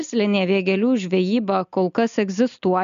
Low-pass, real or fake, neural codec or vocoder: 7.2 kHz; real; none